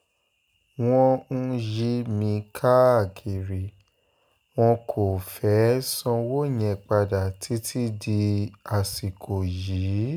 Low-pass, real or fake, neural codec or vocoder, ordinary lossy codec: none; real; none; none